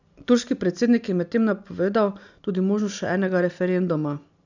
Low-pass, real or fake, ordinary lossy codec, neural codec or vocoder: 7.2 kHz; real; none; none